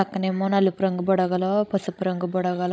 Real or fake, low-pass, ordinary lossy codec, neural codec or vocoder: fake; none; none; codec, 16 kHz, 16 kbps, FreqCodec, larger model